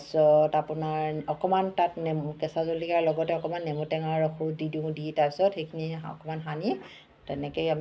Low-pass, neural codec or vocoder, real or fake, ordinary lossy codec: none; none; real; none